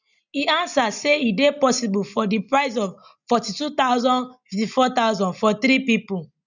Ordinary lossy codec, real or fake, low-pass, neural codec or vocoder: none; real; 7.2 kHz; none